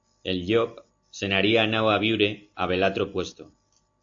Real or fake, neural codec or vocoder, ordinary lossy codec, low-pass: real; none; AAC, 48 kbps; 7.2 kHz